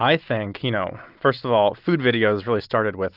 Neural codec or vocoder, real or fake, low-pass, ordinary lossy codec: none; real; 5.4 kHz; Opus, 24 kbps